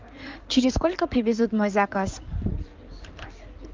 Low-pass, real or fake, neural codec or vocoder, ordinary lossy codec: 7.2 kHz; fake; codec, 16 kHz in and 24 kHz out, 2.2 kbps, FireRedTTS-2 codec; Opus, 24 kbps